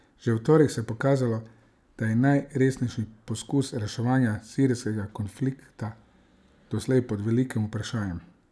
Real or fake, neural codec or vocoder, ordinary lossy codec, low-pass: real; none; none; none